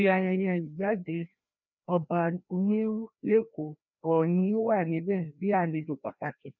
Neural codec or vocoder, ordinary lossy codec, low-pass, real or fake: codec, 16 kHz, 1 kbps, FreqCodec, larger model; none; 7.2 kHz; fake